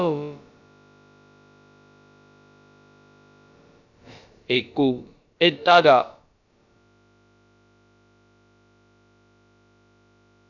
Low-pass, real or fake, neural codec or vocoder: 7.2 kHz; fake; codec, 16 kHz, about 1 kbps, DyCAST, with the encoder's durations